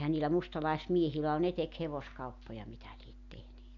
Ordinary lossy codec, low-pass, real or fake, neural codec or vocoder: none; 7.2 kHz; real; none